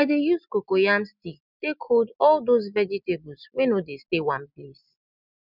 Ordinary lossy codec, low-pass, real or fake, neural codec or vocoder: none; 5.4 kHz; real; none